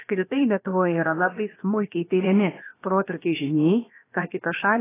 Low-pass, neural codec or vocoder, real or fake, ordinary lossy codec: 3.6 kHz; codec, 16 kHz, about 1 kbps, DyCAST, with the encoder's durations; fake; AAC, 16 kbps